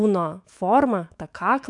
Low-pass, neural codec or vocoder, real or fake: 10.8 kHz; autoencoder, 48 kHz, 128 numbers a frame, DAC-VAE, trained on Japanese speech; fake